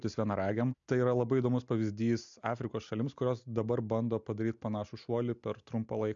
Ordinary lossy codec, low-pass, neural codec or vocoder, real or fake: MP3, 64 kbps; 7.2 kHz; none; real